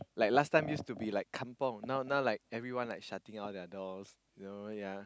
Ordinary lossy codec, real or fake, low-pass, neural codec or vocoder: none; real; none; none